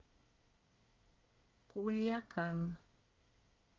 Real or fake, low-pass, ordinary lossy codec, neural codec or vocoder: fake; 7.2 kHz; Opus, 32 kbps; codec, 24 kHz, 1 kbps, SNAC